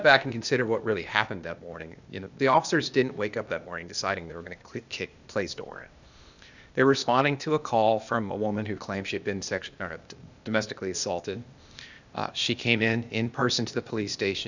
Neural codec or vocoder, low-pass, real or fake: codec, 16 kHz, 0.8 kbps, ZipCodec; 7.2 kHz; fake